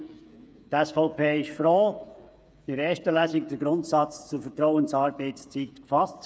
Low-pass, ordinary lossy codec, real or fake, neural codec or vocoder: none; none; fake; codec, 16 kHz, 8 kbps, FreqCodec, smaller model